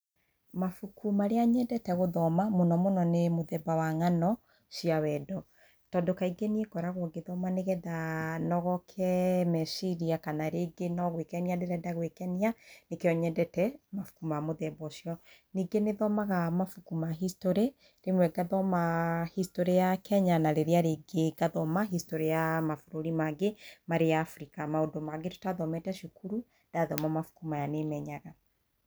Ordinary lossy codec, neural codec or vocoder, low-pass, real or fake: none; none; none; real